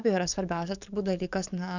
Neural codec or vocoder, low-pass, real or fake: codec, 44.1 kHz, 7.8 kbps, DAC; 7.2 kHz; fake